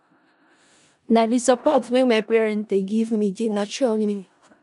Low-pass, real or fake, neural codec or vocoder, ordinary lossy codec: 10.8 kHz; fake; codec, 16 kHz in and 24 kHz out, 0.4 kbps, LongCat-Audio-Codec, four codebook decoder; MP3, 96 kbps